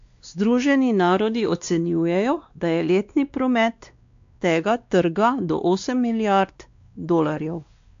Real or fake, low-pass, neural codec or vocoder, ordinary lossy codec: fake; 7.2 kHz; codec, 16 kHz, 2 kbps, X-Codec, WavLM features, trained on Multilingual LibriSpeech; AAC, 64 kbps